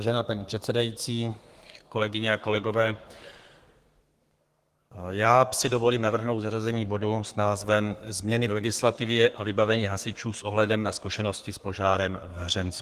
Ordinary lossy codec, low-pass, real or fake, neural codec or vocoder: Opus, 24 kbps; 14.4 kHz; fake; codec, 32 kHz, 1.9 kbps, SNAC